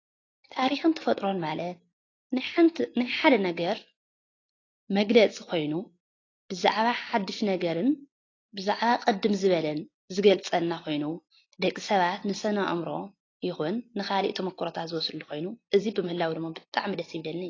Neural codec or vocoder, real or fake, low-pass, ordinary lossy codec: none; real; 7.2 kHz; AAC, 32 kbps